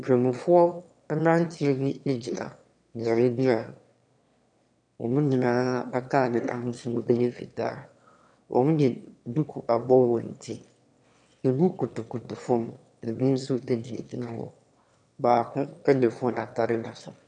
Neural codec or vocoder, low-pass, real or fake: autoencoder, 22.05 kHz, a latent of 192 numbers a frame, VITS, trained on one speaker; 9.9 kHz; fake